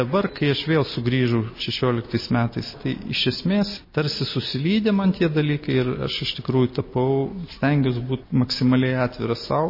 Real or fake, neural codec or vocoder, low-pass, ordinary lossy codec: real; none; 5.4 kHz; MP3, 24 kbps